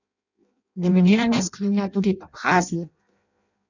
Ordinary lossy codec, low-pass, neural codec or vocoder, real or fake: none; 7.2 kHz; codec, 16 kHz in and 24 kHz out, 0.6 kbps, FireRedTTS-2 codec; fake